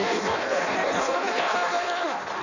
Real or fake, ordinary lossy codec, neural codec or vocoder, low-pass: fake; none; codec, 16 kHz in and 24 kHz out, 0.6 kbps, FireRedTTS-2 codec; 7.2 kHz